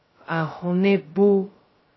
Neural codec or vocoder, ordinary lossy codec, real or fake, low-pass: codec, 16 kHz, 0.2 kbps, FocalCodec; MP3, 24 kbps; fake; 7.2 kHz